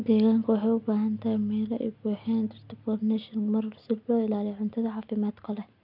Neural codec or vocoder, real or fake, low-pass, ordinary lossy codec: none; real; 5.4 kHz; none